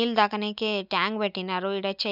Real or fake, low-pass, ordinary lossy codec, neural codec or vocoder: real; 5.4 kHz; none; none